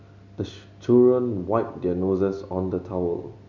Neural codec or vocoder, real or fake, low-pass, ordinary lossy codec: none; real; 7.2 kHz; MP3, 64 kbps